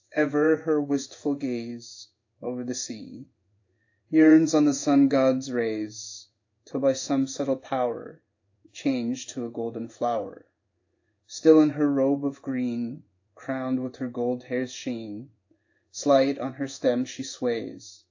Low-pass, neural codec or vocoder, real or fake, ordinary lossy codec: 7.2 kHz; codec, 16 kHz in and 24 kHz out, 1 kbps, XY-Tokenizer; fake; AAC, 48 kbps